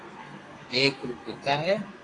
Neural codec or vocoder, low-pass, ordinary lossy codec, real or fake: codec, 32 kHz, 1.9 kbps, SNAC; 10.8 kHz; AAC, 32 kbps; fake